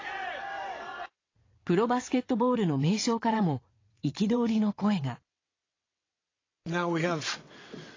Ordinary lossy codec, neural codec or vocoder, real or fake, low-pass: AAC, 32 kbps; vocoder, 22.05 kHz, 80 mel bands, WaveNeXt; fake; 7.2 kHz